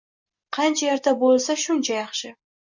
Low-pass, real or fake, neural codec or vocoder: 7.2 kHz; real; none